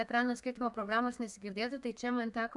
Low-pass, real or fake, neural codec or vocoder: 10.8 kHz; fake; codec, 32 kHz, 1.9 kbps, SNAC